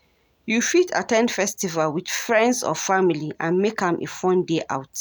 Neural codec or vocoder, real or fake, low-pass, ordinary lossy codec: none; real; none; none